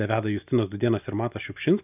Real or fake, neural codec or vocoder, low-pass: real; none; 3.6 kHz